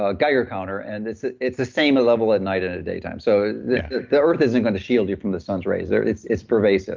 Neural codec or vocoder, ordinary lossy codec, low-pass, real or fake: none; Opus, 24 kbps; 7.2 kHz; real